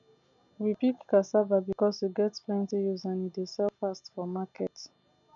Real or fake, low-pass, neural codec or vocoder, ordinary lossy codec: real; 7.2 kHz; none; none